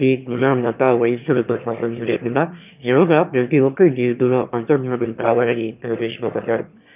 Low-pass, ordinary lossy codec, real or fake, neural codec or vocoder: 3.6 kHz; none; fake; autoencoder, 22.05 kHz, a latent of 192 numbers a frame, VITS, trained on one speaker